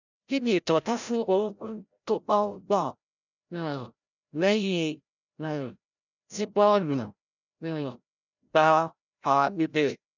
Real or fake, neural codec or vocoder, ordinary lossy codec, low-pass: fake; codec, 16 kHz, 0.5 kbps, FreqCodec, larger model; none; 7.2 kHz